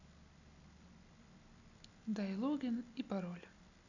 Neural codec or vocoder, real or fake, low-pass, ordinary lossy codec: none; real; 7.2 kHz; none